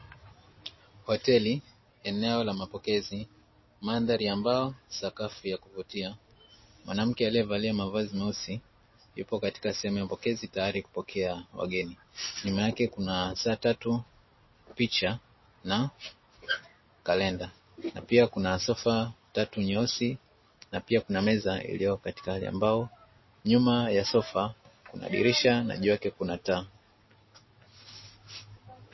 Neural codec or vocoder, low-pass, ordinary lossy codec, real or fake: none; 7.2 kHz; MP3, 24 kbps; real